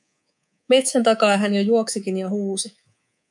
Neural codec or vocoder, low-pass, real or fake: codec, 24 kHz, 3.1 kbps, DualCodec; 10.8 kHz; fake